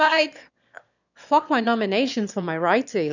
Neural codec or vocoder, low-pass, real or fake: autoencoder, 22.05 kHz, a latent of 192 numbers a frame, VITS, trained on one speaker; 7.2 kHz; fake